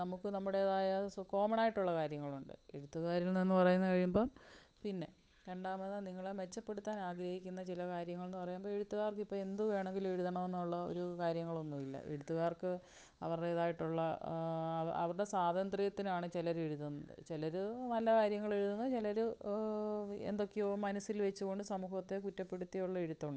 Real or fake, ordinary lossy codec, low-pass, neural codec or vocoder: fake; none; none; codec, 16 kHz, 8 kbps, FunCodec, trained on Chinese and English, 25 frames a second